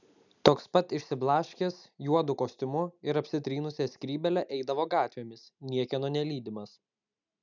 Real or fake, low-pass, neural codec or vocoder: real; 7.2 kHz; none